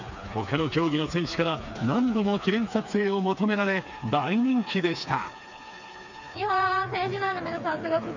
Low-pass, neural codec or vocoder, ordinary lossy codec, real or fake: 7.2 kHz; codec, 16 kHz, 4 kbps, FreqCodec, smaller model; none; fake